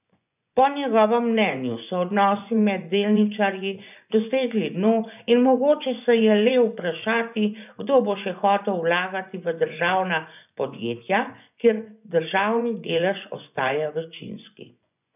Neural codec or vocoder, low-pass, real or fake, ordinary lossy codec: vocoder, 24 kHz, 100 mel bands, Vocos; 3.6 kHz; fake; none